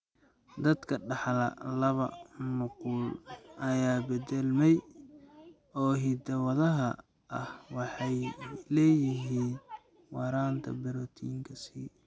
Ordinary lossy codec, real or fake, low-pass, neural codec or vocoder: none; real; none; none